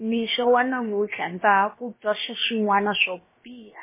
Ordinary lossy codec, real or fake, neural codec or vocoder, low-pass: MP3, 16 kbps; fake; codec, 16 kHz, about 1 kbps, DyCAST, with the encoder's durations; 3.6 kHz